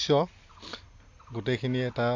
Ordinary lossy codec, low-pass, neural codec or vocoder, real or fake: AAC, 48 kbps; 7.2 kHz; none; real